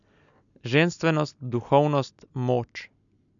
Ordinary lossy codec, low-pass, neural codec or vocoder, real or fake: none; 7.2 kHz; none; real